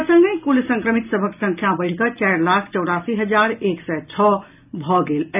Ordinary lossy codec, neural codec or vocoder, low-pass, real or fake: none; none; 3.6 kHz; real